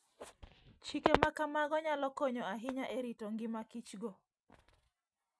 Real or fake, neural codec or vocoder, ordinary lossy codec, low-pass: real; none; none; none